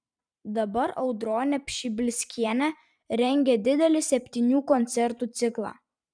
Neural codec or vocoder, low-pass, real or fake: vocoder, 44.1 kHz, 128 mel bands every 512 samples, BigVGAN v2; 9.9 kHz; fake